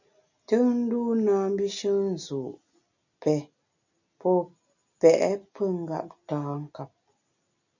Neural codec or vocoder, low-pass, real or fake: none; 7.2 kHz; real